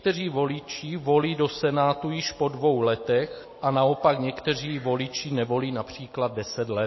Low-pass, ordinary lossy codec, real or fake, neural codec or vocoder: 7.2 kHz; MP3, 24 kbps; real; none